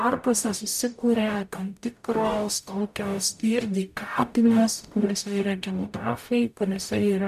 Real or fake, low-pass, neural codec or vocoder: fake; 14.4 kHz; codec, 44.1 kHz, 0.9 kbps, DAC